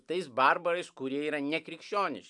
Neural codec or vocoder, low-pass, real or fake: vocoder, 44.1 kHz, 128 mel bands every 256 samples, BigVGAN v2; 10.8 kHz; fake